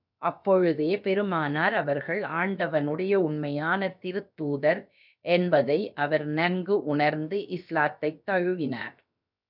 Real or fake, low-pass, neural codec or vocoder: fake; 5.4 kHz; codec, 16 kHz, about 1 kbps, DyCAST, with the encoder's durations